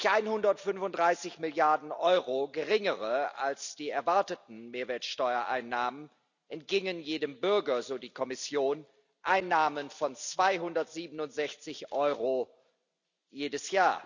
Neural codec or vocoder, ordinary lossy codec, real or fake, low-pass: none; none; real; 7.2 kHz